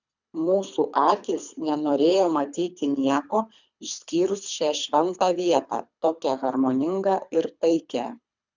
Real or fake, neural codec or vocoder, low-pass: fake; codec, 24 kHz, 3 kbps, HILCodec; 7.2 kHz